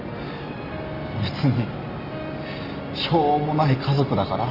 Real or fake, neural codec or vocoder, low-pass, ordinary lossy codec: real; none; 5.4 kHz; Opus, 32 kbps